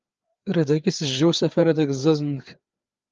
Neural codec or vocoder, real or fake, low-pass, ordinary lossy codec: codec, 16 kHz, 4 kbps, FreqCodec, larger model; fake; 7.2 kHz; Opus, 24 kbps